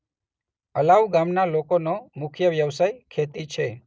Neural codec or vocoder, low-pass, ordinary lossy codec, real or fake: none; none; none; real